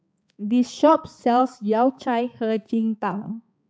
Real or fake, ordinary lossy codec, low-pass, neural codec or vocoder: fake; none; none; codec, 16 kHz, 4 kbps, X-Codec, HuBERT features, trained on balanced general audio